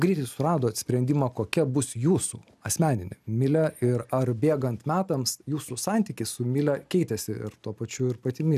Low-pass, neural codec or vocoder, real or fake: 14.4 kHz; none; real